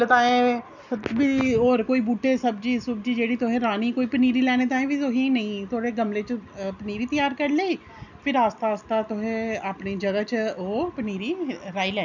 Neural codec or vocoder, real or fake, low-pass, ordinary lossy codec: none; real; 7.2 kHz; none